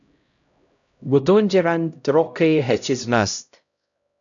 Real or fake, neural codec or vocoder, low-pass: fake; codec, 16 kHz, 0.5 kbps, X-Codec, HuBERT features, trained on LibriSpeech; 7.2 kHz